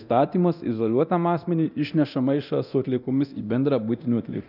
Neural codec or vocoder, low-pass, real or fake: codec, 24 kHz, 0.9 kbps, DualCodec; 5.4 kHz; fake